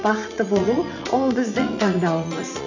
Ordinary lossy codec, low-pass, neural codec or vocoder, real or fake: none; 7.2 kHz; vocoder, 44.1 kHz, 80 mel bands, Vocos; fake